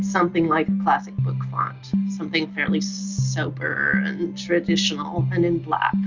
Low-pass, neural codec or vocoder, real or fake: 7.2 kHz; none; real